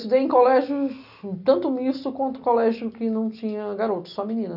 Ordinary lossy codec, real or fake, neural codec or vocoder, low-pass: none; real; none; 5.4 kHz